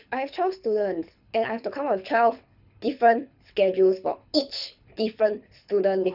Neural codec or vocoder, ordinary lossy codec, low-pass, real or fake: codec, 24 kHz, 6 kbps, HILCodec; none; 5.4 kHz; fake